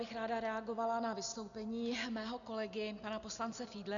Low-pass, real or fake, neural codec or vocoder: 7.2 kHz; real; none